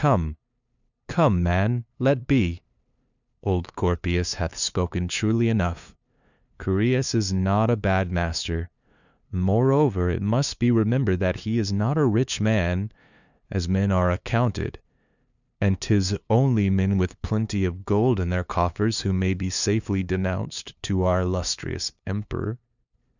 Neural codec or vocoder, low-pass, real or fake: codec, 16 kHz, 2 kbps, FunCodec, trained on LibriTTS, 25 frames a second; 7.2 kHz; fake